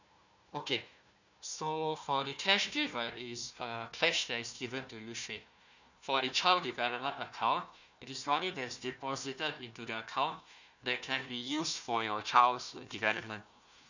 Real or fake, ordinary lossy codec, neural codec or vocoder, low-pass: fake; none; codec, 16 kHz, 1 kbps, FunCodec, trained on Chinese and English, 50 frames a second; 7.2 kHz